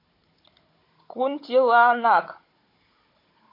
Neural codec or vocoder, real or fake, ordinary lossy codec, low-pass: codec, 16 kHz, 16 kbps, FunCodec, trained on Chinese and English, 50 frames a second; fake; MP3, 32 kbps; 5.4 kHz